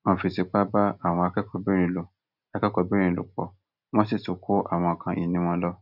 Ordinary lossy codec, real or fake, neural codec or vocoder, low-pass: none; real; none; 5.4 kHz